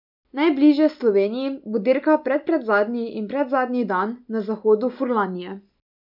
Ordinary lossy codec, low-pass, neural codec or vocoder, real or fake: none; 5.4 kHz; none; real